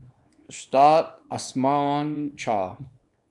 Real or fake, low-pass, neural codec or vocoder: fake; 10.8 kHz; codec, 24 kHz, 0.9 kbps, WavTokenizer, small release